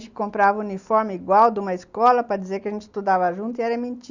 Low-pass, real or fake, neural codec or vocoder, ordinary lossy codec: 7.2 kHz; real; none; Opus, 64 kbps